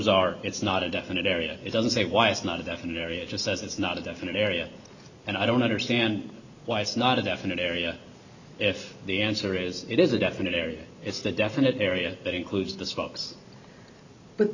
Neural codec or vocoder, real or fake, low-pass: none; real; 7.2 kHz